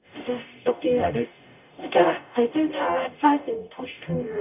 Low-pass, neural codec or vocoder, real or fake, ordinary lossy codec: 3.6 kHz; codec, 44.1 kHz, 0.9 kbps, DAC; fake; none